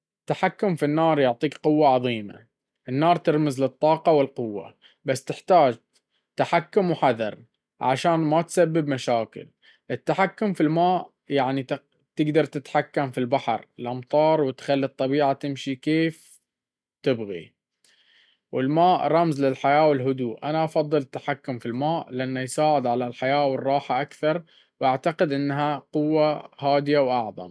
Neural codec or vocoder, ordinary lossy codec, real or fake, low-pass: none; none; real; none